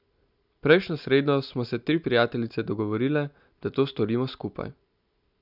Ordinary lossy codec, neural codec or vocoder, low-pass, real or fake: none; none; 5.4 kHz; real